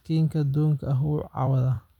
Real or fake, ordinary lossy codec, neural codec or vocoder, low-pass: fake; none; vocoder, 44.1 kHz, 128 mel bands every 256 samples, BigVGAN v2; 19.8 kHz